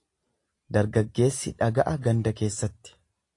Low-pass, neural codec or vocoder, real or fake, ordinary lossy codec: 10.8 kHz; none; real; AAC, 48 kbps